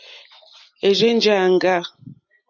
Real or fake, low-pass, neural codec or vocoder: real; 7.2 kHz; none